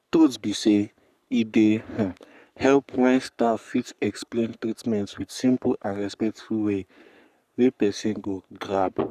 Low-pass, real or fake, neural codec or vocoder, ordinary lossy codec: 14.4 kHz; fake; codec, 44.1 kHz, 3.4 kbps, Pupu-Codec; none